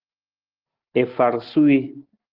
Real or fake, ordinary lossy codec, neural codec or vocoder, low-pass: real; Opus, 16 kbps; none; 5.4 kHz